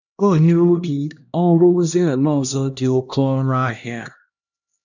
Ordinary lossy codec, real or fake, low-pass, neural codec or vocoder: none; fake; 7.2 kHz; codec, 16 kHz, 1 kbps, X-Codec, HuBERT features, trained on LibriSpeech